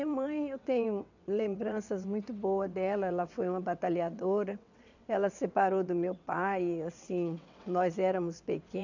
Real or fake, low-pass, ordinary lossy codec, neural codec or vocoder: fake; 7.2 kHz; none; vocoder, 22.05 kHz, 80 mel bands, WaveNeXt